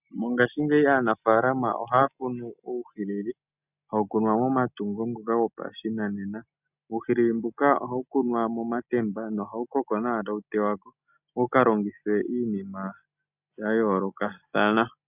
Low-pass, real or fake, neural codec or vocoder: 3.6 kHz; real; none